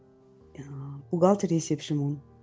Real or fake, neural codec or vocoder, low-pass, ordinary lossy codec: real; none; none; none